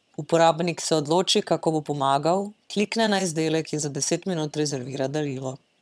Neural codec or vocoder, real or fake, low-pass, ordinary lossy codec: vocoder, 22.05 kHz, 80 mel bands, HiFi-GAN; fake; none; none